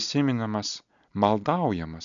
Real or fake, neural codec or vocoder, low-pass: real; none; 7.2 kHz